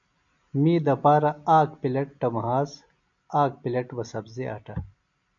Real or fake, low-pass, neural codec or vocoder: real; 7.2 kHz; none